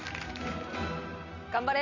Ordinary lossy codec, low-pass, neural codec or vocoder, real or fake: none; 7.2 kHz; none; real